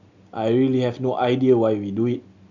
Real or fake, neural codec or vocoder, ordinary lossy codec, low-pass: real; none; none; 7.2 kHz